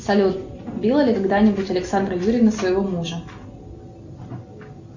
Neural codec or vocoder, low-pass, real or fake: none; 7.2 kHz; real